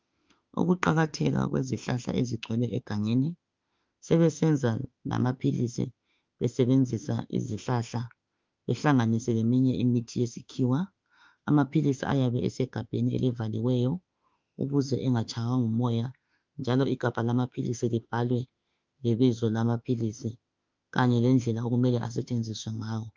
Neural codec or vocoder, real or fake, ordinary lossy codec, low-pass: autoencoder, 48 kHz, 32 numbers a frame, DAC-VAE, trained on Japanese speech; fake; Opus, 24 kbps; 7.2 kHz